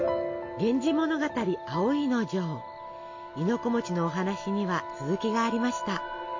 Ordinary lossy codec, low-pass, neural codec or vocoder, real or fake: MP3, 48 kbps; 7.2 kHz; none; real